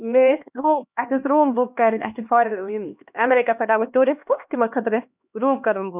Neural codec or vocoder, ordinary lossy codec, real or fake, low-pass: codec, 16 kHz, 2 kbps, X-Codec, HuBERT features, trained on LibriSpeech; none; fake; 3.6 kHz